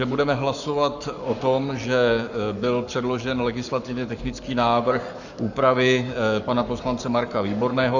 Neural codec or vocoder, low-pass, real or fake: codec, 44.1 kHz, 7.8 kbps, Pupu-Codec; 7.2 kHz; fake